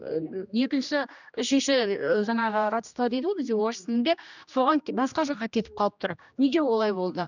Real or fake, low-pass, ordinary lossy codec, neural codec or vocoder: fake; 7.2 kHz; none; codec, 16 kHz, 1 kbps, X-Codec, HuBERT features, trained on general audio